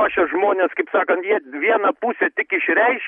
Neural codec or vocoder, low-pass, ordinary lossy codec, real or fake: none; 19.8 kHz; MP3, 48 kbps; real